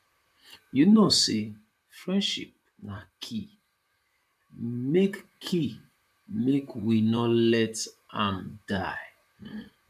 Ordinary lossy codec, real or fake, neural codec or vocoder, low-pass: MP3, 96 kbps; fake; vocoder, 44.1 kHz, 128 mel bands, Pupu-Vocoder; 14.4 kHz